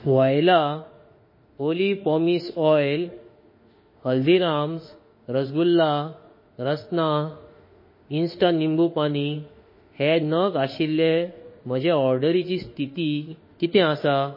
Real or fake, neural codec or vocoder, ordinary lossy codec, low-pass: fake; autoencoder, 48 kHz, 32 numbers a frame, DAC-VAE, trained on Japanese speech; MP3, 24 kbps; 5.4 kHz